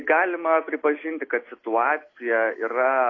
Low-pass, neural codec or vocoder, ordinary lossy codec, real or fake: 7.2 kHz; none; AAC, 32 kbps; real